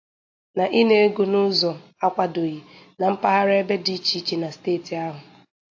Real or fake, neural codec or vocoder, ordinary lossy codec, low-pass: real; none; AAC, 48 kbps; 7.2 kHz